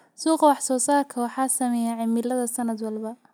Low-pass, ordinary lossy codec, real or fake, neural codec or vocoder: none; none; real; none